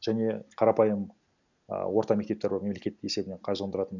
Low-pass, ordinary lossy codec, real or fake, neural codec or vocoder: 7.2 kHz; none; real; none